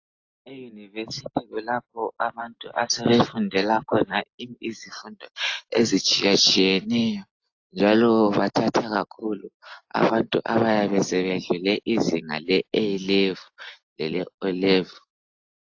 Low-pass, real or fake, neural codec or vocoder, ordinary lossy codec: 7.2 kHz; real; none; AAC, 48 kbps